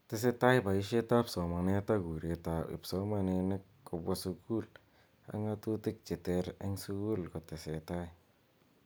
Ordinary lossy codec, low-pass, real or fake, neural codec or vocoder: none; none; real; none